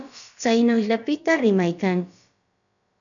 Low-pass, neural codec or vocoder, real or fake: 7.2 kHz; codec, 16 kHz, about 1 kbps, DyCAST, with the encoder's durations; fake